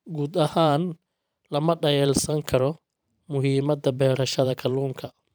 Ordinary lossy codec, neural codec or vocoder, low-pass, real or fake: none; vocoder, 44.1 kHz, 128 mel bands every 512 samples, BigVGAN v2; none; fake